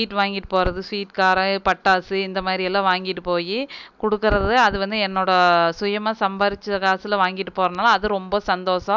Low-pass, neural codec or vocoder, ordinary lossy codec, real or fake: 7.2 kHz; none; none; real